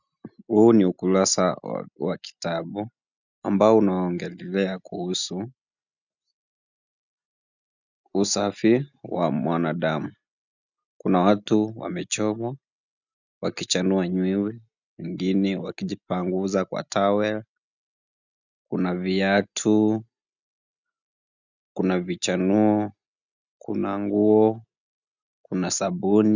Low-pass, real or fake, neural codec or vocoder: 7.2 kHz; real; none